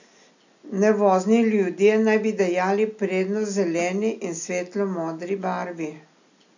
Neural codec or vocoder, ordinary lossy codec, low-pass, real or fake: none; none; 7.2 kHz; real